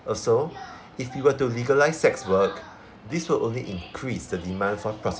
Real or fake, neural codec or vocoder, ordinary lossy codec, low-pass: real; none; none; none